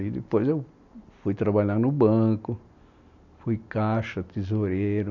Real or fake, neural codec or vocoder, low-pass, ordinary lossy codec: real; none; 7.2 kHz; none